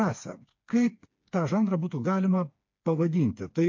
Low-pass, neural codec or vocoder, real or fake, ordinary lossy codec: 7.2 kHz; codec, 16 kHz, 4 kbps, FreqCodec, smaller model; fake; MP3, 48 kbps